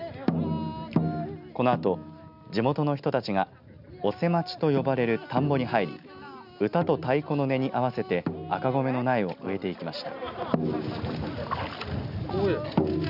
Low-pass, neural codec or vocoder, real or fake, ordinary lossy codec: 5.4 kHz; none; real; none